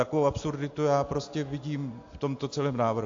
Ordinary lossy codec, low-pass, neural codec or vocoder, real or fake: AAC, 48 kbps; 7.2 kHz; none; real